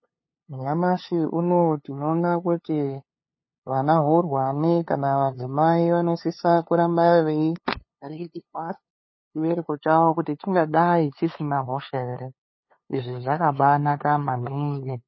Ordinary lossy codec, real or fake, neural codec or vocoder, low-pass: MP3, 24 kbps; fake; codec, 16 kHz, 2 kbps, FunCodec, trained on LibriTTS, 25 frames a second; 7.2 kHz